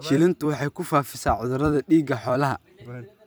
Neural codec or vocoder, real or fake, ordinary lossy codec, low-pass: vocoder, 44.1 kHz, 128 mel bands every 256 samples, BigVGAN v2; fake; none; none